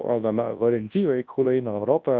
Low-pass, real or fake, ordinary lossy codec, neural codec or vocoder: 7.2 kHz; fake; Opus, 32 kbps; codec, 24 kHz, 0.9 kbps, WavTokenizer, large speech release